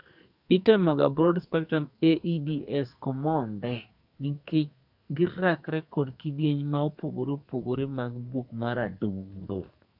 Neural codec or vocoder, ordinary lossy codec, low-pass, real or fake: codec, 44.1 kHz, 2.6 kbps, SNAC; none; 5.4 kHz; fake